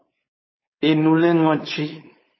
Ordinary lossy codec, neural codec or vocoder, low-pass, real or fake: MP3, 24 kbps; codec, 16 kHz, 4.8 kbps, FACodec; 7.2 kHz; fake